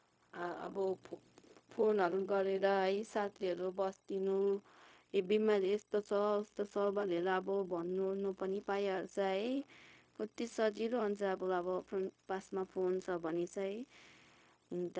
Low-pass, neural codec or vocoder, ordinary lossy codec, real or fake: none; codec, 16 kHz, 0.4 kbps, LongCat-Audio-Codec; none; fake